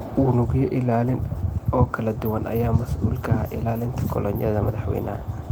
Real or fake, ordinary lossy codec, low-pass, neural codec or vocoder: real; Opus, 24 kbps; 19.8 kHz; none